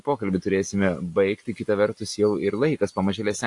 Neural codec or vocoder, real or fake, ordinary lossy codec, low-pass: none; real; AAC, 64 kbps; 10.8 kHz